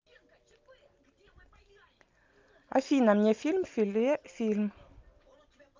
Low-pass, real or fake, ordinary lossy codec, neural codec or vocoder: 7.2 kHz; real; Opus, 24 kbps; none